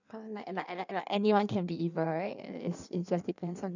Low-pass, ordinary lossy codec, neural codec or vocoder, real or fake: 7.2 kHz; none; codec, 16 kHz in and 24 kHz out, 1.1 kbps, FireRedTTS-2 codec; fake